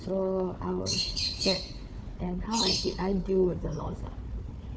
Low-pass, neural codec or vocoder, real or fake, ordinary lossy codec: none; codec, 16 kHz, 4 kbps, FunCodec, trained on Chinese and English, 50 frames a second; fake; none